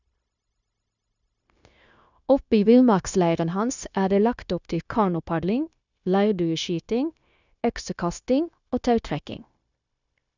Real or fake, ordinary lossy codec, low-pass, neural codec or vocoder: fake; none; 7.2 kHz; codec, 16 kHz, 0.9 kbps, LongCat-Audio-Codec